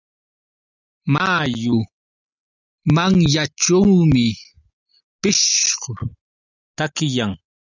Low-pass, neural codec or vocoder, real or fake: 7.2 kHz; none; real